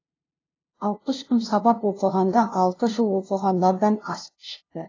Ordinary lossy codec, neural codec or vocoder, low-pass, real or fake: AAC, 32 kbps; codec, 16 kHz, 0.5 kbps, FunCodec, trained on LibriTTS, 25 frames a second; 7.2 kHz; fake